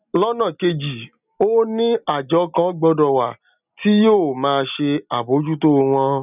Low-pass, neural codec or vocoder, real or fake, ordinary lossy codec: 3.6 kHz; none; real; none